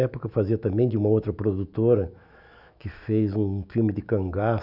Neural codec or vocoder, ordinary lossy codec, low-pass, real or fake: autoencoder, 48 kHz, 128 numbers a frame, DAC-VAE, trained on Japanese speech; none; 5.4 kHz; fake